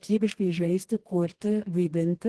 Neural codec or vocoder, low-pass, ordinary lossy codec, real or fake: codec, 24 kHz, 0.9 kbps, WavTokenizer, medium music audio release; 10.8 kHz; Opus, 16 kbps; fake